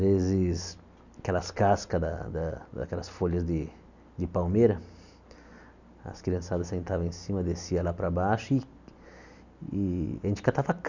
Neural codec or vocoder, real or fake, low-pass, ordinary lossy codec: none; real; 7.2 kHz; none